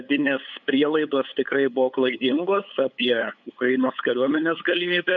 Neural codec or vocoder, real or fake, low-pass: codec, 16 kHz, 8 kbps, FunCodec, trained on LibriTTS, 25 frames a second; fake; 7.2 kHz